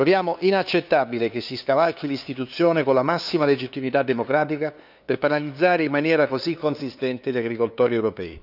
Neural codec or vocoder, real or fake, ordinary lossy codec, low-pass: codec, 16 kHz, 2 kbps, FunCodec, trained on LibriTTS, 25 frames a second; fake; none; 5.4 kHz